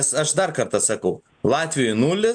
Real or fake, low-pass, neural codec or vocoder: real; 9.9 kHz; none